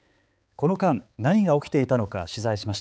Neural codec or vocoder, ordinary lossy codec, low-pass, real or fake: codec, 16 kHz, 4 kbps, X-Codec, HuBERT features, trained on balanced general audio; none; none; fake